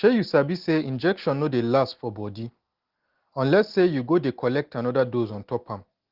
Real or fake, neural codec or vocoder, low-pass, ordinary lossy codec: real; none; 5.4 kHz; Opus, 16 kbps